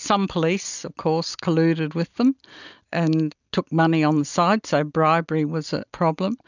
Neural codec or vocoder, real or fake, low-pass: none; real; 7.2 kHz